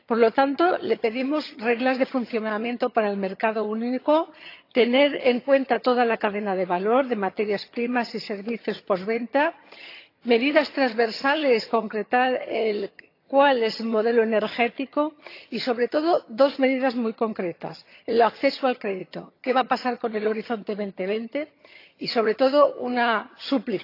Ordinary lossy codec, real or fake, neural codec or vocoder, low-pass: AAC, 32 kbps; fake; vocoder, 22.05 kHz, 80 mel bands, HiFi-GAN; 5.4 kHz